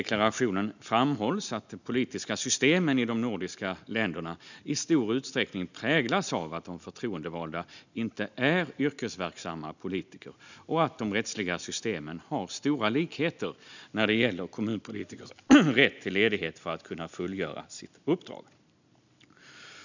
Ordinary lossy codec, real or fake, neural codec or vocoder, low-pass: none; real; none; 7.2 kHz